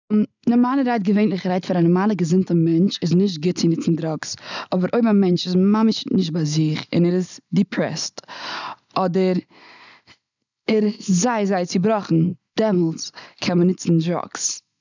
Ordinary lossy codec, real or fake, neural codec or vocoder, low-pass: none; real; none; 7.2 kHz